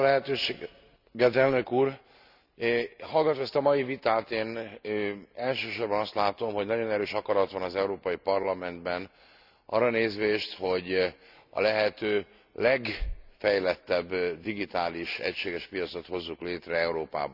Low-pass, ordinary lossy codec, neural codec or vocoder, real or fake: 5.4 kHz; none; none; real